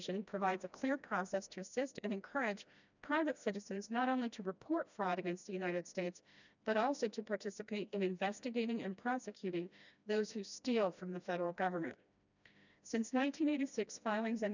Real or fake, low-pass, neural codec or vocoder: fake; 7.2 kHz; codec, 16 kHz, 1 kbps, FreqCodec, smaller model